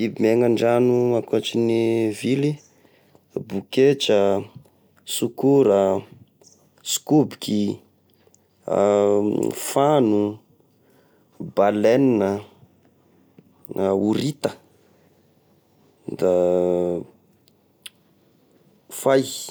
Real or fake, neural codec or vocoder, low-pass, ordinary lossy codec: real; none; none; none